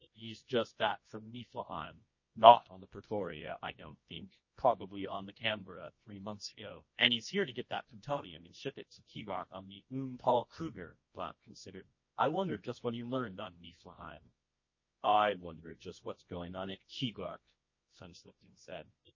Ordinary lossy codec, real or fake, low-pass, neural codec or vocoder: MP3, 32 kbps; fake; 7.2 kHz; codec, 24 kHz, 0.9 kbps, WavTokenizer, medium music audio release